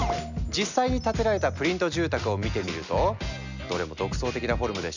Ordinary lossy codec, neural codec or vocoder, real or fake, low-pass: none; none; real; 7.2 kHz